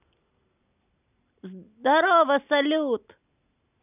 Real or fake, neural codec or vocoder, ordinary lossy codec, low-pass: real; none; none; 3.6 kHz